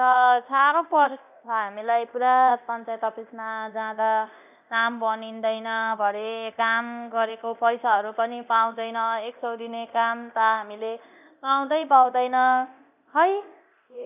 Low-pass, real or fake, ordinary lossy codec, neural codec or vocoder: 3.6 kHz; fake; none; codec, 24 kHz, 0.9 kbps, DualCodec